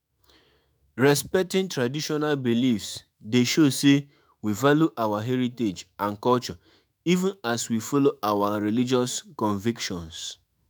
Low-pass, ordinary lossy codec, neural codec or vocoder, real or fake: none; none; autoencoder, 48 kHz, 128 numbers a frame, DAC-VAE, trained on Japanese speech; fake